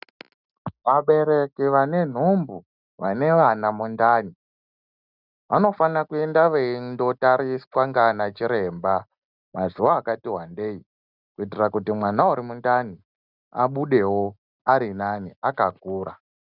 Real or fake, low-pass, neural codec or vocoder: real; 5.4 kHz; none